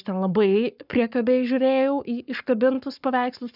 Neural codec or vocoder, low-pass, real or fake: codec, 44.1 kHz, 7.8 kbps, Pupu-Codec; 5.4 kHz; fake